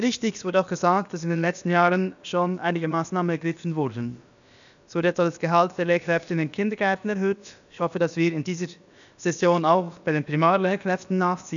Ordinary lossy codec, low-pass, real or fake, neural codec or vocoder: none; 7.2 kHz; fake; codec, 16 kHz, about 1 kbps, DyCAST, with the encoder's durations